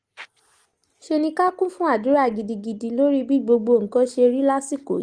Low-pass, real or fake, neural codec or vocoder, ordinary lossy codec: 9.9 kHz; real; none; Opus, 24 kbps